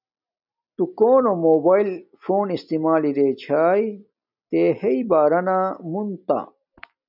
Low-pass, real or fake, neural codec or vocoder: 5.4 kHz; real; none